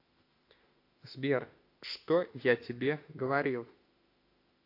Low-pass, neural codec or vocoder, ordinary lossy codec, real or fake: 5.4 kHz; autoencoder, 48 kHz, 32 numbers a frame, DAC-VAE, trained on Japanese speech; AAC, 32 kbps; fake